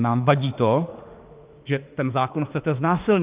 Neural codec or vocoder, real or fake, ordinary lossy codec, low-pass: autoencoder, 48 kHz, 32 numbers a frame, DAC-VAE, trained on Japanese speech; fake; Opus, 32 kbps; 3.6 kHz